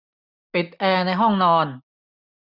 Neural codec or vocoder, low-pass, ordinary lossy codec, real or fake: none; 5.4 kHz; none; real